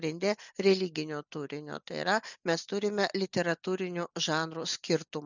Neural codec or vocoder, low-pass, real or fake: none; 7.2 kHz; real